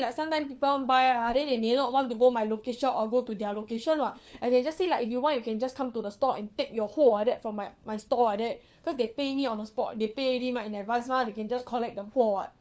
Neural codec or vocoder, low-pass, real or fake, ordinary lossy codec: codec, 16 kHz, 4 kbps, FunCodec, trained on LibriTTS, 50 frames a second; none; fake; none